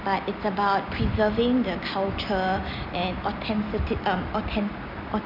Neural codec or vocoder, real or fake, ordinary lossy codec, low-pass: none; real; none; 5.4 kHz